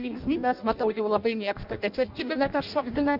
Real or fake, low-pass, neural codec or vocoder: fake; 5.4 kHz; codec, 16 kHz in and 24 kHz out, 0.6 kbps, FireRedTTS-2 codec